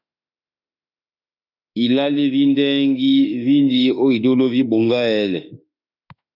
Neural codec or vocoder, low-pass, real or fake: autoencoder, 48 kHz, 32 numbers a frame, DAC-VAE, trained on Japanese speech; 5.4 kHz; fake